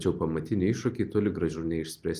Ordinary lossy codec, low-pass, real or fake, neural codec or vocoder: Opus, 24 kbps; 14.4 kHz; real; none